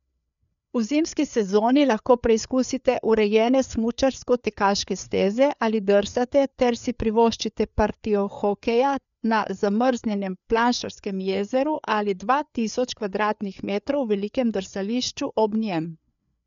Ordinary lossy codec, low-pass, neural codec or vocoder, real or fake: none; 7.2 kHz; codec, 16 kHz, 4 kbps, FreqCodec, larger model; fake